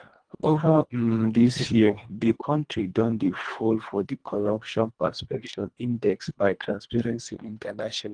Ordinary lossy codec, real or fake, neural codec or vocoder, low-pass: Opus, 24 kbps; fake; codec, 24 kHz, 1.5 kbps, HILCodec; 9.9 kHz